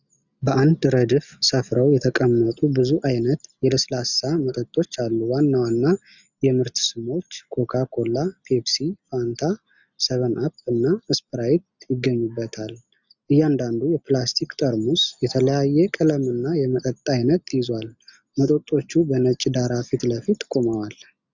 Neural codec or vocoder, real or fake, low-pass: none; real; 7.2 kHz